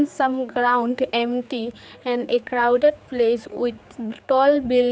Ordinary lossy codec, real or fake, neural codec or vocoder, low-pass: none; fake; codec, 16 kHz, 4 kbps, X-Codec, HuBERT features, trained on general audio; none